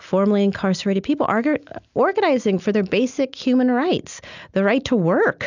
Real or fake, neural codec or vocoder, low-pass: real; none; 7.2 kHz